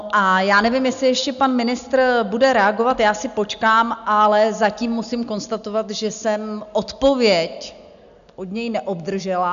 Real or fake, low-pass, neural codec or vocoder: real; 7.2 kHz; none